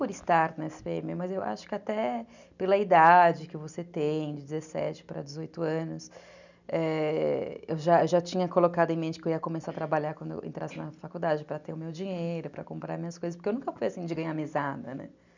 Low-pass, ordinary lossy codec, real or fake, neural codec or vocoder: 7.2 kHz; none; real; none